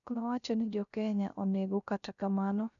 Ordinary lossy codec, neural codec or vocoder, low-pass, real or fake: none; codec, 16 kHz, 0.3 kbps, FocalCodec; 7.2 kHz; fake